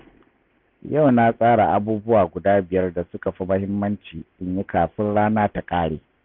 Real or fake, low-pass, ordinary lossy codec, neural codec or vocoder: real; 5.4 kHz; none; none